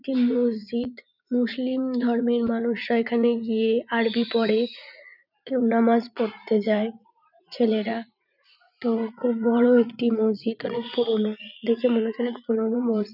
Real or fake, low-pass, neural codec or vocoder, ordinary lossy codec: fake; 5.4 kHz; vocoder, 44.1 kHz, 80 mel bands, Vocos; none